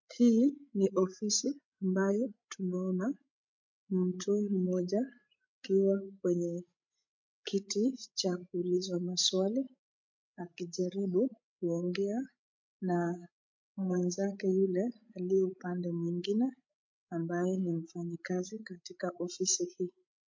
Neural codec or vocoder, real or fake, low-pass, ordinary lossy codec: codec, 16 kHz, 16 kbps, FreqCodec, larger model; fake; 7.2 kHz; MP3, 48 kbps